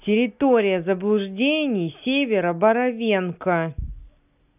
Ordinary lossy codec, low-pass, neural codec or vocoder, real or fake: none; 3.6 kHz; none; real